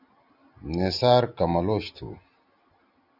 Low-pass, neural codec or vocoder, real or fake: 5.4 kHz; none; real